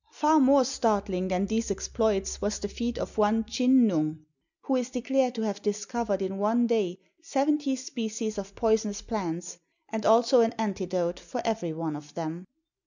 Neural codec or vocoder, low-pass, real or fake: none; 7.2 kHz; real